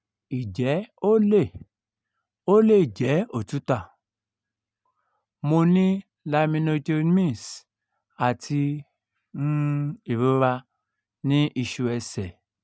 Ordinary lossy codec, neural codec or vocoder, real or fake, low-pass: none; none; real; none